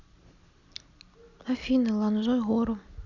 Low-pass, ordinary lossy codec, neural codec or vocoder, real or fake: 7.2 kHz; none; none; real